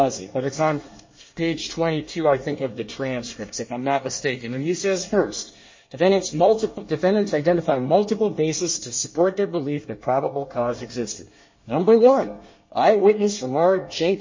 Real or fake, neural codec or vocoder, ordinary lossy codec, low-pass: fake; codec, 24 kHz, 1 kbps, SNAC; MP3, 32 kbps; 7.2 kHz